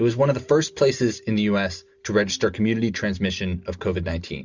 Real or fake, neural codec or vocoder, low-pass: real; none; 7.2 kHz